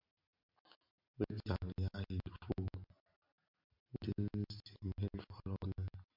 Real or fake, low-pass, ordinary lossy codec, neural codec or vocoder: real; 5.4 kHz; MP3, 48 kbps; none